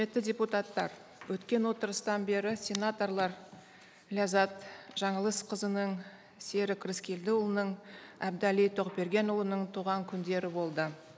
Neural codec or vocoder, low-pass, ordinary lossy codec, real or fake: none; none; none; real